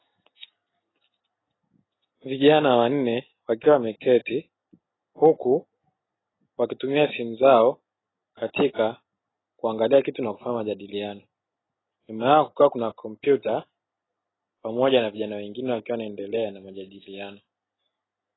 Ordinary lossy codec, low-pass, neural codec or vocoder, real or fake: AAC, 16 kbps; 7.2 kHz; none; real